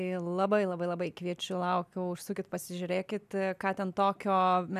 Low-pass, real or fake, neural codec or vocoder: 14.4 kHz; real; none